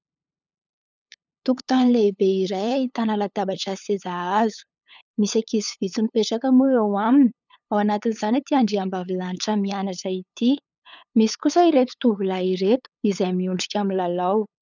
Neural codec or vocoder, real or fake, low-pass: codec, 16 kHz, 8 kbps, FunCodec, trained on LibriTTS, 25 frames a second; fake; 7.2 kHz